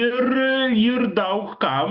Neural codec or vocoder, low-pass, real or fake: autoencoder, 48 kHz, 128 numbers a frame, DAC-VAE, trained on Japanese speech; 5.4 kHz; fake